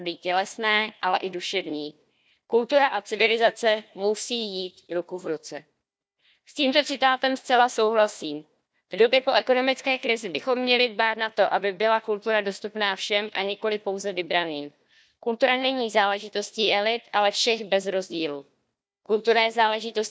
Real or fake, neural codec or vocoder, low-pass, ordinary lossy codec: fake; codec, 16 kHz, 1 kbps, FunCodec, trained on Chinese and English, 50 frames a second; none; none